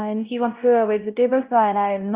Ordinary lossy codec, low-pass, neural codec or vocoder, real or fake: Opus, 24 kbps; 3.6 kHz; codec, 16 kHz, 0.5 kbps, X-Codec, WavLM features, trained on Multilingual LibriSpeech; fake